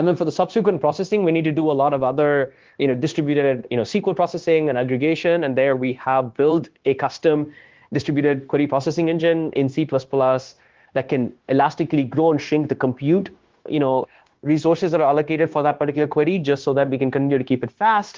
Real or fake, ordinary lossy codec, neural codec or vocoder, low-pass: fake; Opus, 16 kbps; codec, 16 kHz, 0.9 kbps, LongCat-Audio-Codec; 7.2 kHz